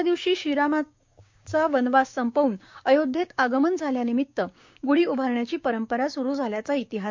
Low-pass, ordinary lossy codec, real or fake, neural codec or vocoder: 7.2 kHz; MP3, 48 kbps; fake; codec, 16 kHz, 6 kbps, DAC